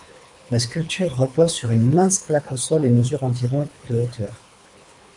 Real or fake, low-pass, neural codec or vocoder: fake; 10.8 kHz; codec, 24 kHz, 3 kbps, HILCodec